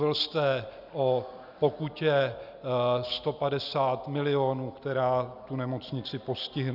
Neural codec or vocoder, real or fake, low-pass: none; real; 5.4 kHz